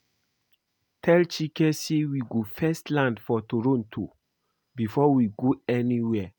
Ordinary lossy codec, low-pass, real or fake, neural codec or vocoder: none; none; real; none